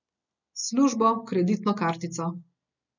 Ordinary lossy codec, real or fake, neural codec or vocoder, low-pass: none; real; none; 7.2 kHz